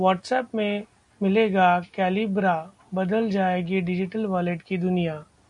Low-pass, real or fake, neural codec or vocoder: 10.8 kHz; real; none